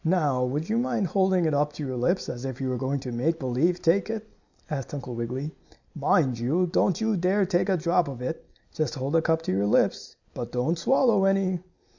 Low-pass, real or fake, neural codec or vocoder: 7.2 kHz; real; none